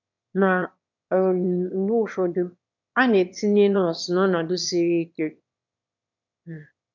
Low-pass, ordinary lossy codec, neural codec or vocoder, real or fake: 7.2 kHz; none; autoencoder, 22.05 kHz, a latent of 192 numbers a frame, VITS, trained on one speaker; fake